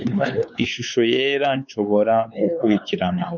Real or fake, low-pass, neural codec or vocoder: fake; 7.2 kHz; codec, 16 kHz, 4 kbps, X-Codec, WavLM features, trained on Multilingual LibriSpeech